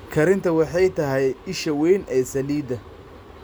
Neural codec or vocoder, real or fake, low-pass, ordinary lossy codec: none; real; none; none